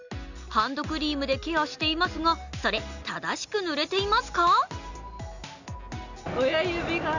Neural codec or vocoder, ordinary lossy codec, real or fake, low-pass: none; none; real; 7.2 kHz